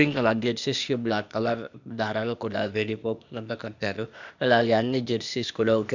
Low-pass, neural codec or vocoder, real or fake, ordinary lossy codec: 7.2 kHz; codec, 16 kHz, 0.8 kbps, ZipCodec; fake; none